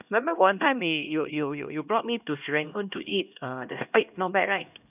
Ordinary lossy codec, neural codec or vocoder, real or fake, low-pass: none; codec, 16 kHz, 1 kbps, X-Codec, HuBERT features, trained on LibriSpeech; fake; 3.6 kHz